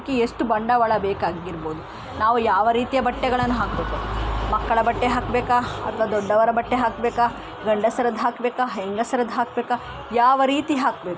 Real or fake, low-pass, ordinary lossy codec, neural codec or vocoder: real; none; none; none